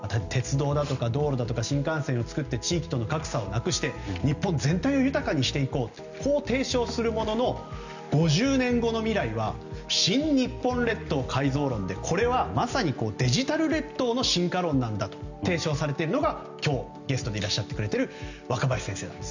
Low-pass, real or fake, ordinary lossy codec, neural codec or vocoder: 7.2 kHz; real; none; none